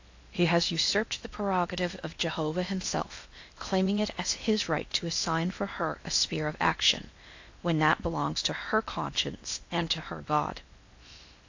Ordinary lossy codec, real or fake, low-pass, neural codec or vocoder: AAC, 48 kbps; fake; 7.2 kHz; codec, 16 kHz in and 24 kHz out, 0.8 kbps, FocalCodec, streaming, 65536 codes